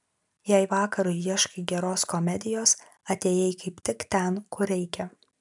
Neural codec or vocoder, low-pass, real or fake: none; 10.8 kHz; real